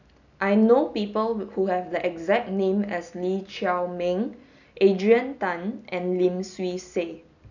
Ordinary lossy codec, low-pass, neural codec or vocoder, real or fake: none; 7.2 kHz; none; real